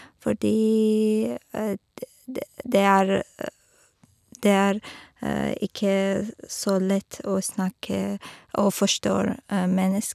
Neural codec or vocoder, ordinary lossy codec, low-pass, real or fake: none; none; 14.4 kHz; real